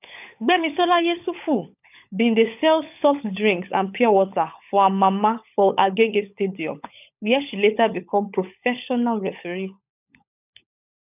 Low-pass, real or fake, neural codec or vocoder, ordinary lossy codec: 3.6 kHz; fake; codec, 16 kHz, 16 kbps, FunCodec, trained on LibriTTS, 50 frames a second; none